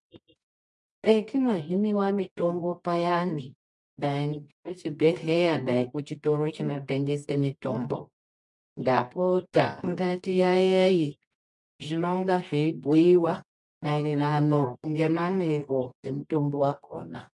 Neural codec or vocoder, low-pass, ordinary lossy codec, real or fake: codec, 24 kHz, 0.9 kbps, WavTokenizer, medium music audio release; 10.8 kHz; MP3, 64 kbps; fake